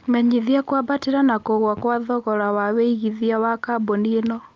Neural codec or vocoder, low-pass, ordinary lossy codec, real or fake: none; 7.2 kHz; Opus, 24 kbps; real